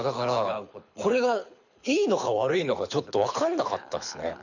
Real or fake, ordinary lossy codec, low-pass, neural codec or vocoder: fake; none; 7.2 kHz; codec, 24 kHz, 6 kbps, HILCodec